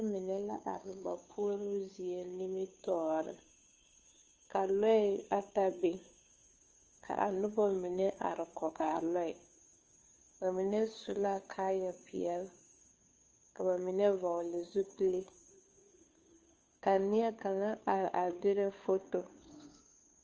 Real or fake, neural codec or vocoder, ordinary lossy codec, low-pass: fake; codec, 16 kHz, 2 kbps, FunCodec, trained on Chinese and English, 25 frames a second; Opus, 24 kbps; 7.2 kHz